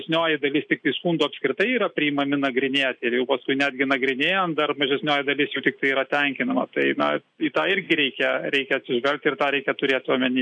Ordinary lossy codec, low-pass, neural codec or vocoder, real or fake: MP3, 64 kbps; 9.9 kHz; none; real